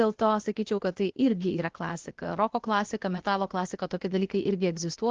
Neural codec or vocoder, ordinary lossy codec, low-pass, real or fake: codec, 16 kHz, 0.8 kbps, ZipCodec; Opus, 16 kbps; 7.2 kHz; fake